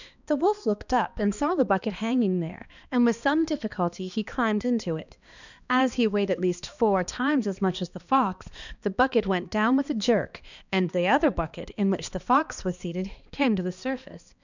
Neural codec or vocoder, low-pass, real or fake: codec, 16 kHz, 2 kbps, X-Codec, HuBERT features, trained on balanced general audio; 7.2 kHz; fake